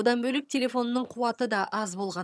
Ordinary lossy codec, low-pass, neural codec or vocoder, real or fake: none; none; vocoder, 22.05 kHz, 80 mel bands, HiFi-GAN; fake